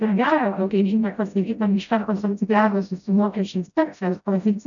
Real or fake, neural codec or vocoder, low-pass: fake; codec, 16 kHz, 0.5 kbps, FreqCodec, smaller model; 7.2 kHz